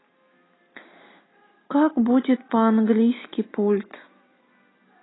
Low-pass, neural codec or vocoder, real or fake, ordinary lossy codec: 7.2 kHz; none; real; AAC, 16 kbps